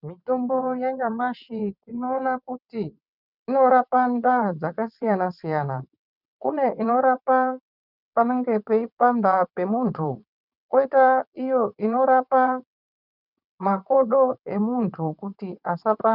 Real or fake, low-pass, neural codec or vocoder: fake; 5.4 kHz; vocoder, 22.05 kHz, 80 mel bands, WaveNeXt